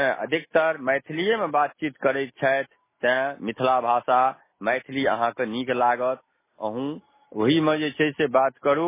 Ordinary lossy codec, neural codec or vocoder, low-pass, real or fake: MP3, 16 kbps; none; 3.6 kHz; real